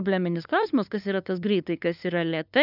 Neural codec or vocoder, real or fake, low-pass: codec, 16 kHz, 2 kbps, FunCodec, trained on LibriTTS, 25 frames a second; fake; 5.4 kHz